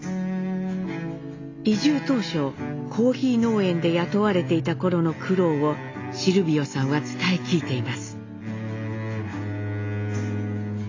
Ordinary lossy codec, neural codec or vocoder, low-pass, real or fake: AAC, 32 kbps; none; 7.2 kHz; real